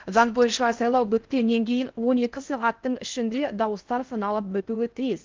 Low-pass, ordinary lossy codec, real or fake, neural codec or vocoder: 7.2 kHz; Opus, 32 kbps; fake; codec, 16 kHz in and 24 kHz out, 0.6 kbps, FocalCodec, streaming, 4096 codes